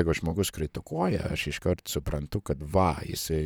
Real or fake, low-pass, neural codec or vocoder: fake; 19.8 kHz; vocoder, 44.1 kHz, 128 mel bands, Pupu-Vocoder